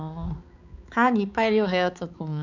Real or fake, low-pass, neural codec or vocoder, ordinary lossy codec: fake; 7.2 kHz; codec, 16 kHz, 4 kbps, X-Codec, HuBERT features, trained on balanced general audio; none